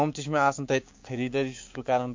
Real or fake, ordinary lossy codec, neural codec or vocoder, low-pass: fake; MP3, 48 kbps; codec, 16 kHz, 6 kbps, DAC; 7.2 kHz